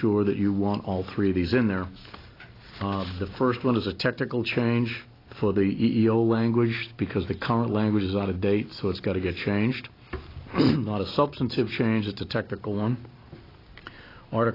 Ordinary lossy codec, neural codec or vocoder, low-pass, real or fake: AAC, 24 kbps; none; 5.4 kHz; real